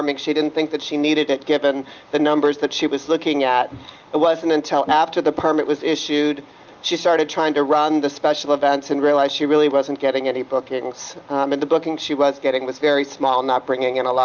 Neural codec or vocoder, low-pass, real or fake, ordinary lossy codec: none; 7.2 kHz; real; Opus, 32 kbps